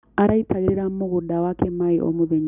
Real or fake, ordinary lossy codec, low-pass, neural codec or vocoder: real; none; 3.6 kHz; none